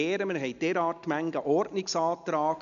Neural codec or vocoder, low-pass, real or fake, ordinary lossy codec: none; 7.2 kHz; real; none